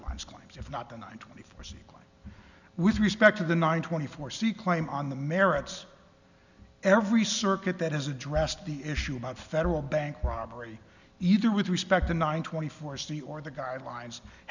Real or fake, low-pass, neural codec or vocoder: real; 7.2 kHz; none